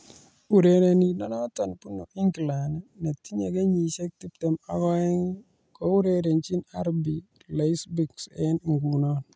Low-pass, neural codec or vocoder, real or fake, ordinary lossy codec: none; none; real; none